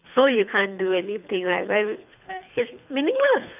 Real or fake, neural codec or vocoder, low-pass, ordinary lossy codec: fake; codec, 24 kHz, 3 kbps, HILCodec; 3.6 kHz; none